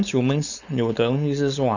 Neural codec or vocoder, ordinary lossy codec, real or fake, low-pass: codec, 16 kHz, 4.8 kbps, FACodec; none; fake; 7.2 kHz